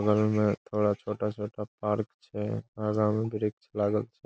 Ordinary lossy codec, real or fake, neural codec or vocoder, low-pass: none; real; none; none